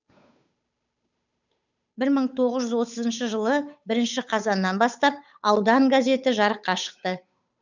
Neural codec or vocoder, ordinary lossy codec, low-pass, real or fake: codec, 16 kHz, 8 kbps, FunCodec, trained on Chinese and English, 25 frames a second; none; 7.2 kHz; fake